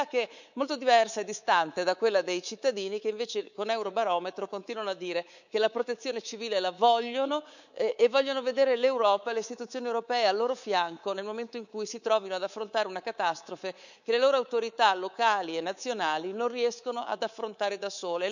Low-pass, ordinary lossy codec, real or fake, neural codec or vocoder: 7.2 kHz; none; fake; codec, 24 kHz, 3.1 kbps, DualCodec